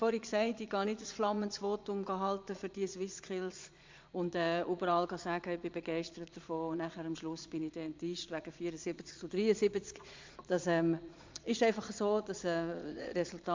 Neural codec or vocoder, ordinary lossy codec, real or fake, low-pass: vocoder, 22.05 kHz, 80 mel bands, Vocos; MP3, 64 kbps; fake; 7.2 kHz